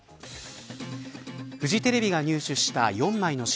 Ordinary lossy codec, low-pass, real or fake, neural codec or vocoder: none; none; real; none